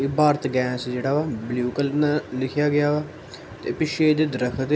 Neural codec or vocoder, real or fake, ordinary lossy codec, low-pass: none; real; none; none